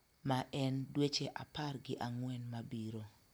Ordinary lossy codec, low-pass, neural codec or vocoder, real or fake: none; none; none; real